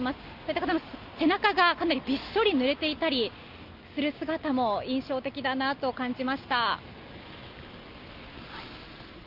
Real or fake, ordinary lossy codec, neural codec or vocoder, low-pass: real; Opus, 24 kbps; none; 5.4 kHz